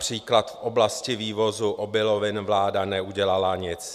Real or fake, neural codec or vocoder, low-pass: real; none; 14.4 kHz